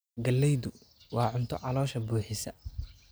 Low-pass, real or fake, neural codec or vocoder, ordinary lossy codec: none; real; none; none